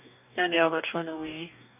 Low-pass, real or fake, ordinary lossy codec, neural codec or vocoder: 3.6 kHz; fake; none; codec, 44.1 kHz, 2.6 kbps, DAC